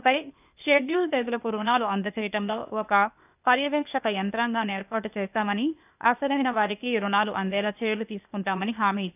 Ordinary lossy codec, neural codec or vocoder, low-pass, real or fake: none; codec, 16 kHz, 0.8 kbps, ZipCodec; 3.6 kHz; fake